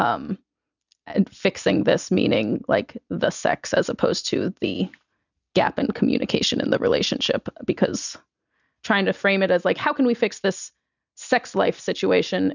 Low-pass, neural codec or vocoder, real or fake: 7.2 kHz; none; real